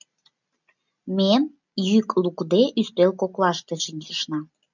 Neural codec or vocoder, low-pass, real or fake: none; 7.2 kHz; real